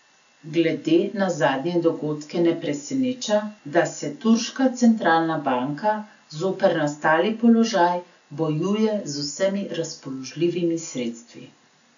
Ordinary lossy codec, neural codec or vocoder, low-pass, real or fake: none; none; 7.2 kHz; real